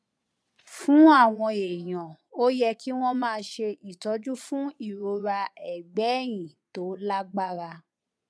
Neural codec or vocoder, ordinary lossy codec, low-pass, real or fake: vocoder, 22.05 kHz, 80 mel bands, Vocos; none; none; fake